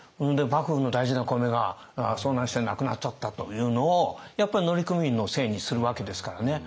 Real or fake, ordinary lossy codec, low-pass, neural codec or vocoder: real; none; none; none